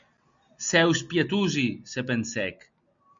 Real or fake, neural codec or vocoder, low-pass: real; none; 7.2 kHz